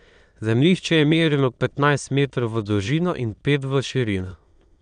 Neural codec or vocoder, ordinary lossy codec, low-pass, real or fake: autoencoder, 22.05 kHz, a latent of 192 numbers a frame, VITS, trained on many speakers; none; 9.9 kHz; fake